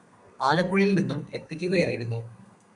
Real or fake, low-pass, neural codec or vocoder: fake; 10.8 kHz; codec, 32 kHz, 1.9 kbps, SNAC